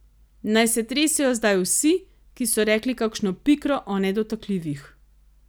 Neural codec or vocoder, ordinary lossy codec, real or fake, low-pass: none; none; real; none